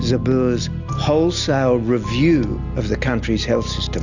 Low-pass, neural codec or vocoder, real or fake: 7.2 kHz; none; real